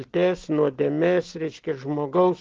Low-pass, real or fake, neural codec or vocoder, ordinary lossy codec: 7.2 kHz; real; none; Opus, 16 kbps